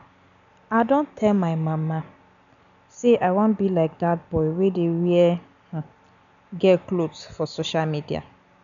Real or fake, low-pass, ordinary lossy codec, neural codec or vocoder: real; 7.2 kHz; none; none